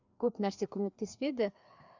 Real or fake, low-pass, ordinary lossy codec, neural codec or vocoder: fake; 7.2 kHz; none; codec, 16 kHz, 2 kbps, FreqCodec, larger model